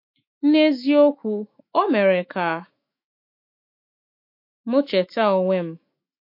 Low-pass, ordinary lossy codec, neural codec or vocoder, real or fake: 5.4 kHz; MP3, 32 kbps; autoencoder, 48 kHz, 128 numbers a frame, DAC-VAE, trained on Japanese speech; fake